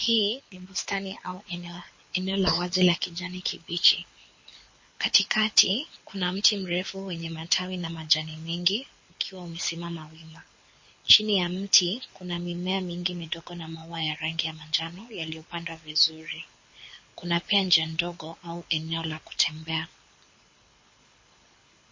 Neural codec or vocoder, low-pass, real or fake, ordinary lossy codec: codec, 24 kHz, 6 kbps, HILCodec; 7.2 kHz; fake; MP3, 32 kbps